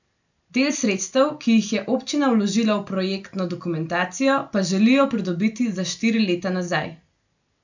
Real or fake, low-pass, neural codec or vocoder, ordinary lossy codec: real; 7.2 kHz; none; none